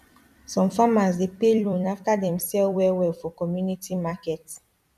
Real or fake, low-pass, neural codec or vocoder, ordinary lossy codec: fake; 14.4 kHz; vocoder, 44.1 kHz, 128 mel bands every 256 samples, BigVGAN v2; none